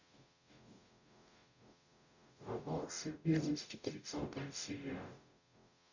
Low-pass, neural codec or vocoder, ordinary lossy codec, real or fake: 7.2 kHz; codec, 44.1 kHz, 0.9 kbps, DAC; none; fake